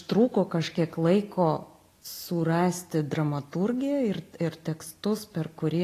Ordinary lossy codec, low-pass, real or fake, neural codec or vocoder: AAC, 48 kbps; 14.4 kHz; real; none